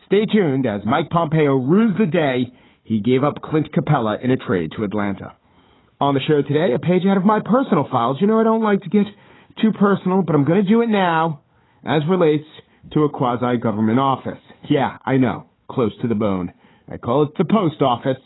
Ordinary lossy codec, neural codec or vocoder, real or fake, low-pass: AAC, 16 kbps; codec, 16 kHz, 4 kbps, FunCodec, trained on Chinese and English, 50 frames a second; fake; 7.2 kHz